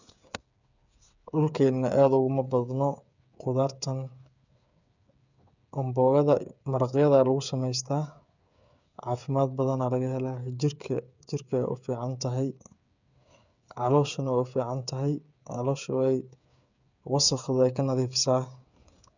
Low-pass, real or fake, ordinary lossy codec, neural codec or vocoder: 7.2 kHz; fake; none; codec, 16 kHz, 8 kbps, FreqCodec, smaller model